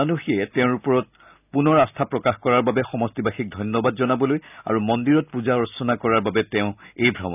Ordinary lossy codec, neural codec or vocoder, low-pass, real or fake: none; none; 3.6 kHz; real